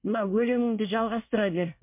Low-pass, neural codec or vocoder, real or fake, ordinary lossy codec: 3.6 kHz; codec, 24 kHz, 1 kbps, SNAC; fake; MP3, 32 kbps